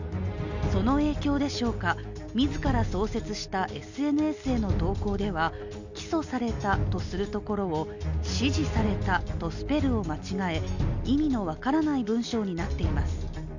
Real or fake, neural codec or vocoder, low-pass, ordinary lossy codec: real; none; 7.2 kHz; none